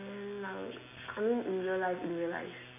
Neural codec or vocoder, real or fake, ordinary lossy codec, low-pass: none; real; none; 3.6 kHz